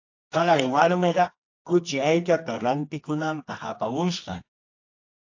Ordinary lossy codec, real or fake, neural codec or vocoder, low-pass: AAC, 48 kbps; fake; codec, 24 kHz, 0.9 kbps, WavTokenizer, medium music audio release; 7.2 kHz